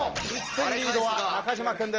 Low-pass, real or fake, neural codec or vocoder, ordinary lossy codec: 7.2 kHz; real; none; Opus, 24 kbps